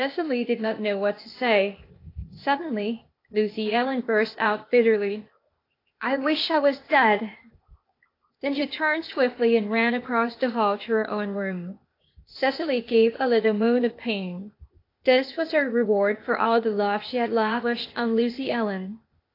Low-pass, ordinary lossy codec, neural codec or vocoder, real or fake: 5.4 kHz; AAC, 32 kbps; codec, 16 kHz, 0.8 kbps, ZipCodec; fake